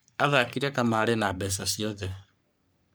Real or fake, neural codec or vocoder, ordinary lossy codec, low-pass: fake; codec, 44.1 kHz, 3.4 kbps, Pupu-Codec; none; none